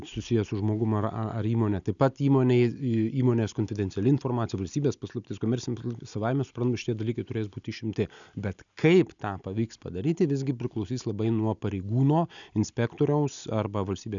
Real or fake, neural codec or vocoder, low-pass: real; none; 7.2 kHz